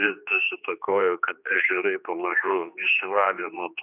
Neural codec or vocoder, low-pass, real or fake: codec, 16 kHz, 2 kbps, X-Codec, HuBERT features, trained on general audio; 3.6 kHz; fake